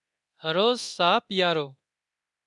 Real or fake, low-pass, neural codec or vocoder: fake; 10.8 kHz; codec, 24 kHz, 0.9 kbps, DualCodec